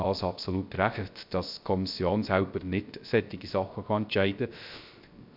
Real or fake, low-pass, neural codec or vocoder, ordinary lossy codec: fake; 5.4 kHz; codec, 16 kHz, 0.3 kbps, FocalCodec; MP3, 48 kbps